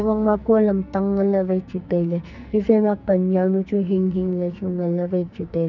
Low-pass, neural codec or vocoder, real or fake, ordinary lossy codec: 7.2 kHz; codec, 44.1 kHz, 2.6 kbps, SNAC; fake; none